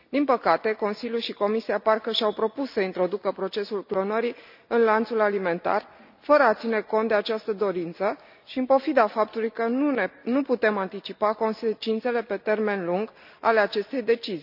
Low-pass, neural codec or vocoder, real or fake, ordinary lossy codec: 5.4 kHz; none; real; none